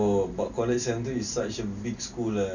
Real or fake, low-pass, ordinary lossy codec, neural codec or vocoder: real; 7.2 kHz; none; none